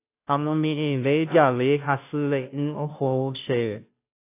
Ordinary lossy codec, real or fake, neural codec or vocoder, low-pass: AAC, 24 kbps; fake; codec, 16 kHz, 0.5 kbps, FunCodec, trained on Chinese and English, 25 frames a second; 3.6 kHz